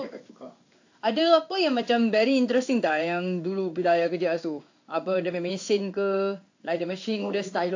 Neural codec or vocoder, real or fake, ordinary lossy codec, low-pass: codec, 16 kHz in and 24 kHz out, 1 kbps, XY-Tokenizer; fake; AAC, 48 kbps; 7.2 kHz